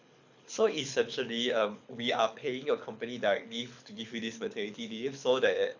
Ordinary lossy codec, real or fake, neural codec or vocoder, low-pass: none; fake; codec, 24 kHz, 6 kbps, HILCodec; 7.2 kHz